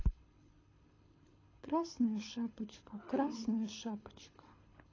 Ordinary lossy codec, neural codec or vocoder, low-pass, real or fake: AAC, 32 kbps; codec, 24 kHz, 6 kbps, HILCodec; 7.2 kHz; fake